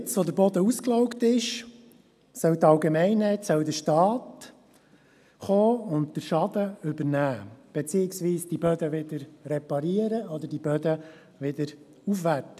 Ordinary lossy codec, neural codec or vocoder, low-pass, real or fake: none; none; 14.4 kHz; real